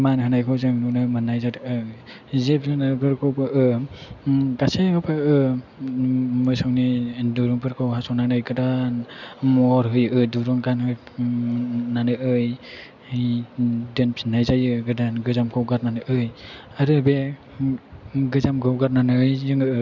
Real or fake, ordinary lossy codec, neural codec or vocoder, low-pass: real; none; none; 7.2 kHz